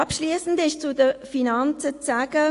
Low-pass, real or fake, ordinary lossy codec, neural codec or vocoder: 10.8 kHz; real; AAC, 48 kbps; none